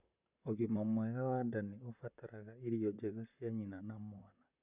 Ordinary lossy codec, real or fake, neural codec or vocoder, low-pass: none; fake; vocoder, 44.1 kHz, 128 mel bands, Pupu-Vocoder; 3.6 kHz